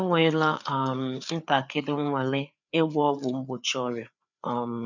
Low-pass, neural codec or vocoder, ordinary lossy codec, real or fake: 7.2 kHz; codec, 16 kHz, 4 kbps, FreqCodec, larger model; none; fake